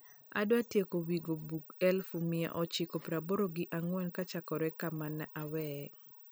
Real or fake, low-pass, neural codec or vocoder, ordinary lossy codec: real; none; none; none